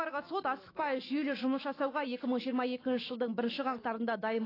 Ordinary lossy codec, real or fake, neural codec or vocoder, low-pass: AAC, 24 kbps; fake; autoencoder, 48 kHz, 128 numbers a frame, DAC-VAE, trained on Japanese speech; 5.4 kHz